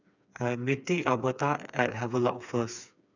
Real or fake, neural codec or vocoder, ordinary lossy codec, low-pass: fake; codec, 16 kHz, 4 kbps, FreqCodec, smaller model; none; 7.2 kHz